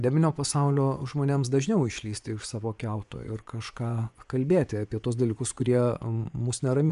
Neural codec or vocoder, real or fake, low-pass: vocoder, 24 kHz, 100 mel bands, Vocos; fake; 10.8 kHz